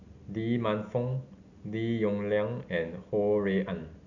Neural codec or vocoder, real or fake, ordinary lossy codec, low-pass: none; real; none; 7.2 kHz